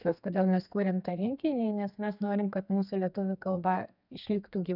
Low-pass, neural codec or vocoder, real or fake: 5.4 kHz; codec, 32 kHz, 1.9 kbps, SNAC; fake